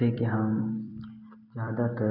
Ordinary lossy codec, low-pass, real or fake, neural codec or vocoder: none; 5.4 kHz; fake; vocoder, 44.1 kHz, 128 mel bands every 256 samples, BigVGAN v2